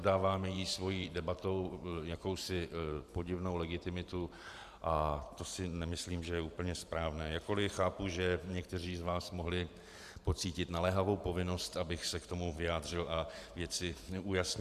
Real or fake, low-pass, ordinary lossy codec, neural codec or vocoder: fake; 14.4 kHz; Opus, 64 kbps; vocoder, 44.1 kHz, 128 mel bands every 512 samples, BigVGAN v2